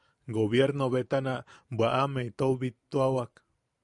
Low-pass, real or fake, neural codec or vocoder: 10.8 kHz; fake; vocoder, 44.1 kHz, 128 mel bands every 512 samples, BigVGAN v2